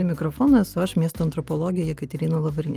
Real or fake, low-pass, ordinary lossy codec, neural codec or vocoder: real; 14.4 kHz; Opus, 32 kbps; none